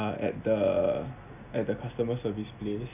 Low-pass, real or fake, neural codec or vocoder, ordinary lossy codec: 3.6 kHz; real; none; none